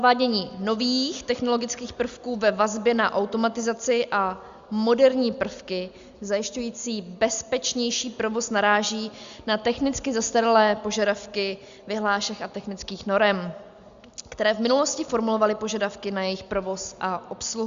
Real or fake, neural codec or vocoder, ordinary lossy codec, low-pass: real; none; Opus, 64 kbps; 7.2 kHz